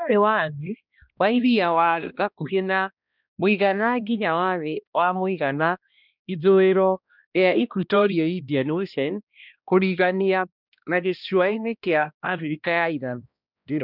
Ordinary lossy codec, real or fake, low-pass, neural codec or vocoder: none; fake; 5.4 kHz; codec, 16 kHz, 1 kbps, X-Codec, HuBERT features, trained on balanced general audio